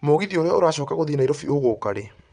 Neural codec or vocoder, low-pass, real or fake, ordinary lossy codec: vocoder, 22.05 kHz, 80 mel bands, WaveNeXt; 9.9 kHz; fake; none